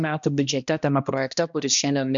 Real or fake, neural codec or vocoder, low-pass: fake; codec, 16 kHz, 1 kbps, X-Codec, HuBERT features, trained on balanced general audio; 7.2 kHz